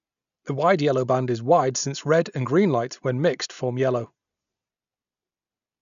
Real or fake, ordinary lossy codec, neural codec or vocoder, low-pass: real; none; none; 7.2 kHz